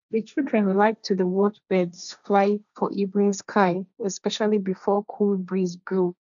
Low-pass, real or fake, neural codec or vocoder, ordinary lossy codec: 7.2 kHz; fake; codec, 16 kHz, 1.1 kbps, Voila-Tokenizer; none